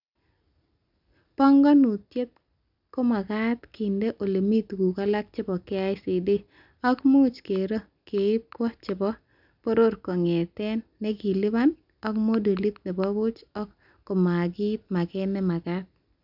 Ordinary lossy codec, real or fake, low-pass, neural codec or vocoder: none; real; 5.4 kHz; none